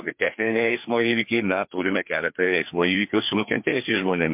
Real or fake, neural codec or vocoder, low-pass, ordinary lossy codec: fake; codec, 16 kHz, 2 kbps, FreqCodec, larger model; 3.6 kHz; MP3, 32 kbps